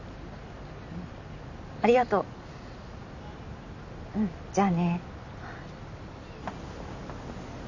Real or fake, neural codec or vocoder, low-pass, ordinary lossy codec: real; none; 7.2 kHz; none